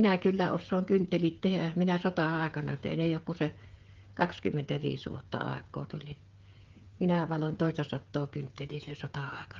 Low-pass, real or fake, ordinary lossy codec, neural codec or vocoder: 7.2 kHz; fake; Opus, 32 kbps; codec, 16 kHz, 8 kbps, FreqCodec, smaller model